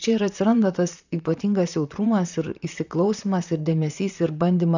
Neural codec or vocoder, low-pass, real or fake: vocoder, 44.1 kHz, 128 mel bands, Pupu-Vocoder; 7.2 kHz; fake